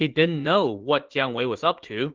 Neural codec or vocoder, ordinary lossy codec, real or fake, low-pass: vocoder, 44.1 kHz, 80 mel bands, Vocos; Opus, 16 kbps; fake; 7.2 kHz